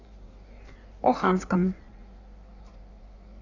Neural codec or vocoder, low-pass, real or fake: codec, 16 kHz in and 24 kHz out, 1.1 kbps, FireRedTTS-2 codec; 7.2 kHz; fake